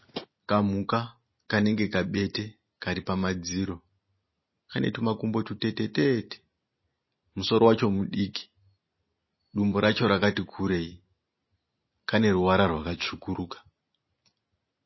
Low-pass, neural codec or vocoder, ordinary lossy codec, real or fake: 7.2 kHz; none; MP3, 24 kbps; real